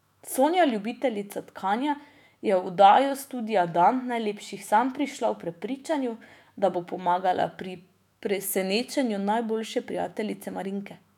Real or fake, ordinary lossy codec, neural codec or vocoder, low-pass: fake; none; autoencoder, 48 kHz, 128 numbers a frame, DAC-VAE, trained on Japanese speech; 19.8 kHz